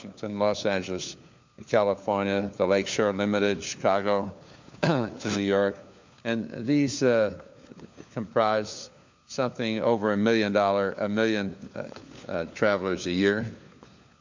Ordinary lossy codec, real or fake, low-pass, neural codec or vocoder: MP3, 64 kbps; fake; 7.2 kHz; codec, 16 kHz, 4 kbps, FunCodec, trained on LibriTTS, 50 frames a second